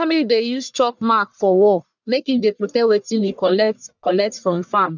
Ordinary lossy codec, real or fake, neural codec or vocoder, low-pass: none; fake; codec, 44.1 kHz, 1.7 kbps, Pupu-Codec; 7.2 kHz